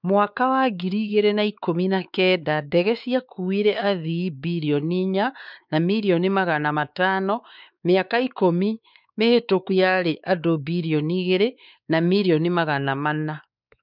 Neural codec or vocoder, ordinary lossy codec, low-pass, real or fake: codec, 16 kHz, 4 kbps, X-Codec, WavLM features, trained on Multilingual LibriSpeech; none; 5.4 kHz; fake